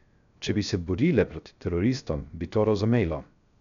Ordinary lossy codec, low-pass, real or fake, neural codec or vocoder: none; 7.2 kHz; fake; codec, 16 kHz, 0.3 kbps, FocalCodec